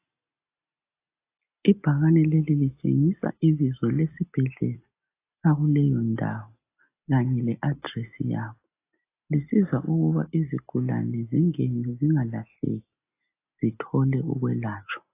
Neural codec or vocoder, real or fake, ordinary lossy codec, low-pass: none; real; AAC, 24 kbps; 3.6 kHz